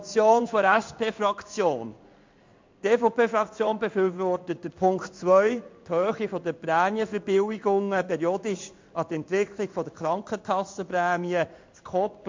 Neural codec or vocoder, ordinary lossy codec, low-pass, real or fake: codec, 16 kHz in and 24 kHz out, 1 kbps, XY-Tokenizer; MP3, 48 kbps; 7.2 kHz; fake